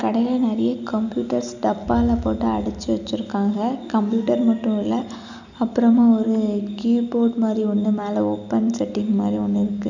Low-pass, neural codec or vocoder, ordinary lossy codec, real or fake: 7.2 kHz; none; none; real